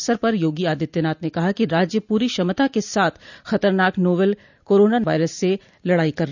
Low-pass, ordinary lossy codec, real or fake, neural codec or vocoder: 7.2 kHz; none; real; none